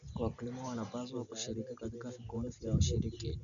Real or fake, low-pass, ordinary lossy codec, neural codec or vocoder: real; 7.2 kHz; none; none